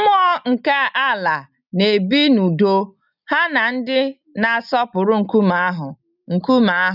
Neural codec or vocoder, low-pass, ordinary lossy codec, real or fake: none; 5.4 kHz; none; real